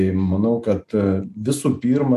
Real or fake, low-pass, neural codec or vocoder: real; 14.4 kHz; none